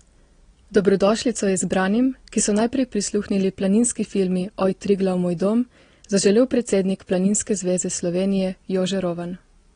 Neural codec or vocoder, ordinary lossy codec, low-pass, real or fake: none; AAC, 32 kbps; 9.9 kHz; real